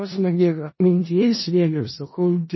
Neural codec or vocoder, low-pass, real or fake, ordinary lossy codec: codec, 16 kHz in and 24 kHz out, 0.4 kbps, LongCat-Audio-Codec, four codebook decoder; 7.2 kHz; fake; MP3, 24 kbps